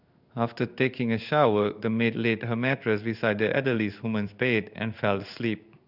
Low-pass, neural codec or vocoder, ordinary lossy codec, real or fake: 5.4 kHz; codec, 16 kHz in and 24 kHz out, 1 kbps, XY-Tokenizer; none; fake